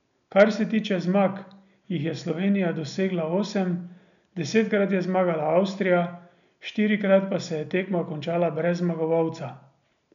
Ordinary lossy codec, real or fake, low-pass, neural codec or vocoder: none; real; 7.2 kHz; none